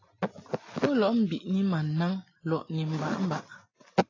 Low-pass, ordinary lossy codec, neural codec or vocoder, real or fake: 7.2 kHz; AAC, 32 kbps; none; real